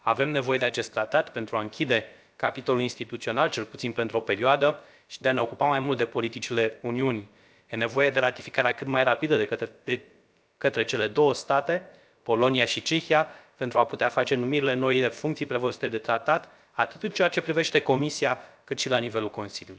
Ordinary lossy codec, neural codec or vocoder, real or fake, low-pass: none; codec, 16 kHz, about 1 kbps, DyCAST, with the encoder's durations; fake; none